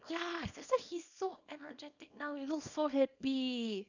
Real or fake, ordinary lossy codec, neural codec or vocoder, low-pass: fake; none; codec, 24 kHz, 0.9 kbps, WavTokenizer, small release; 7.2 kHz